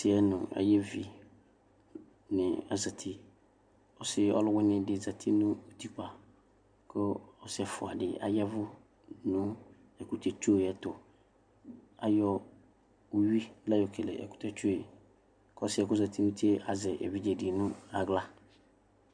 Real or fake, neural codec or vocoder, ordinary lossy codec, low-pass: real; none; MP3, 96 kbps; 9.9 kHz